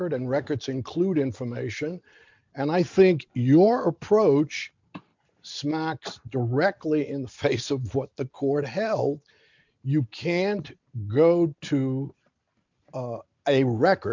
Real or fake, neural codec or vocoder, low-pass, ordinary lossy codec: real; none; 7.2 kHz; MP3, 64 kbps